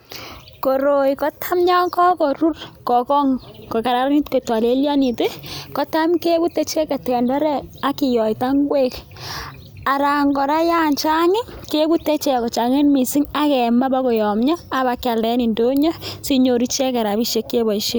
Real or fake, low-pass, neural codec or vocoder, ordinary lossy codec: real; none; none; none